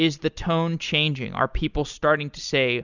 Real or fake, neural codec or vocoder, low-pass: real; none; 7.2 kHz